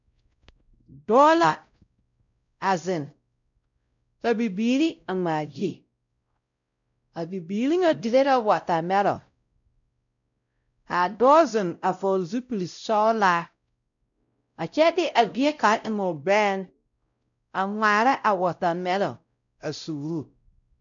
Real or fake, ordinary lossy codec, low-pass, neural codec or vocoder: fake; MP3, 96 kbps; 7.2 kHz; codec, 16 kHz, 0.5 kbps, X-Codec, WavLM features, trained on Multilingual LibriSpeech